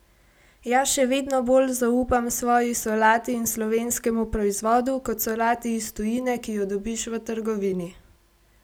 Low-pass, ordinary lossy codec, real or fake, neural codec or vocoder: none; none; real; none